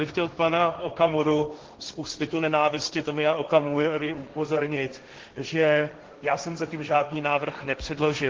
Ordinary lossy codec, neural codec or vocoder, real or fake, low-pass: Opus, 16 kbps; codec, 16 kHz, 1.1 kbps, Voila-Tokenizer; fake; 7.2 kHz